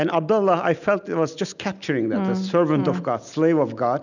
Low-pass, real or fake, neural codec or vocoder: 7.2 kHz; real; none